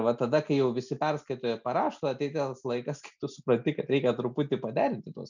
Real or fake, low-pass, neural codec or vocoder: real; 7.2 kHz; none